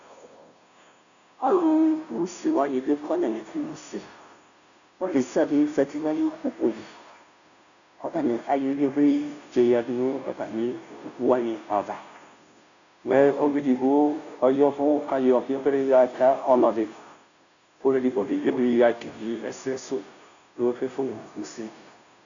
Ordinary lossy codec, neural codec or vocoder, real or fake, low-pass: MP3, 96 kbps; codec, 16 kHz, 0.5 kbps, FunCodec, trained on Chinese and English, 25 frames a second; fake; 7.2 kHz